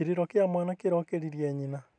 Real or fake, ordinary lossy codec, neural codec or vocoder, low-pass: real; none; none; 9.9 kHz